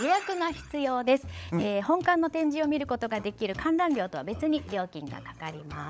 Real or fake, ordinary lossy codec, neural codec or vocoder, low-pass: fake; none; codec, 16 kHz, 16 kbps, FunCodec, trained on LibriTTS, 50 frames a second; none